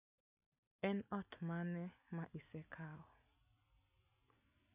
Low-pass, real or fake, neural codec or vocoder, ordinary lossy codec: 3.6 kHz; real; none; none